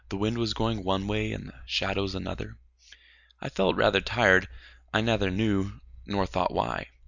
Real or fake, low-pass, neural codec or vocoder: real; 7.2 kHz; none